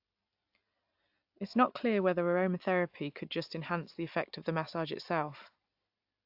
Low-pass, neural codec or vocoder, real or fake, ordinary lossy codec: 5.4 kHz; none; real; AAC, 48 kbps